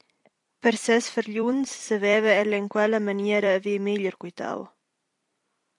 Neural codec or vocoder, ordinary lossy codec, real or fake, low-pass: vocoder, 44.1 kHz, 128 mel bands every 256 samples, BigVGAN v2; AAC, 64 kbps; fake; 10.8 kHz